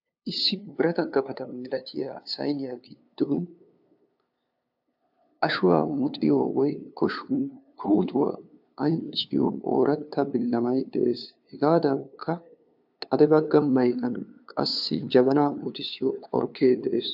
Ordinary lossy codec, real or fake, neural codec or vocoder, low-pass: AAC, 48 kbps; fake; codec, 16 kHz, 2 kbps, FunCodec, trained on LibriTTS, 25 frames a second; 5.4 kHz